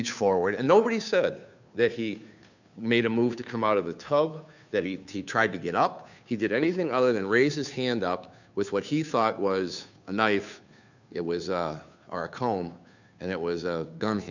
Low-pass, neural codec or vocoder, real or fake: 7.2 kHz; codec, 16 kHz, 2 kbps, FunCodec, trained on Chinese and English, 25 frames a second; fake